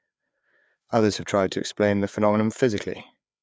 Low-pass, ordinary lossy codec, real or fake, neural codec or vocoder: none; none; fake; codec, 16 kHz, 4 kbps, FreqCodec, larger model